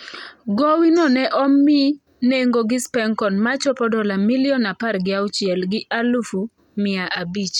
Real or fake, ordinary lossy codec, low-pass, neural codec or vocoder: real; none; 19.8 kHz; none